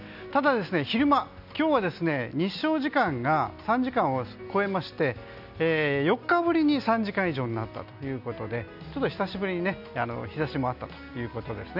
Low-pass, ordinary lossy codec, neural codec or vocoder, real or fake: 5.4 kHz; none; none; real